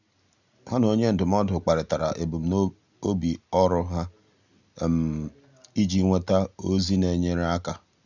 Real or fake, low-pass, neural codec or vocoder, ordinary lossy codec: real; 7.2 kHz; none; none